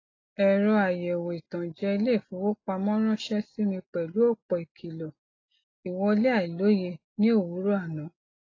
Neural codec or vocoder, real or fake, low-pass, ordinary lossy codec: none; real; 7.2 kHz; AAC, 32 kbps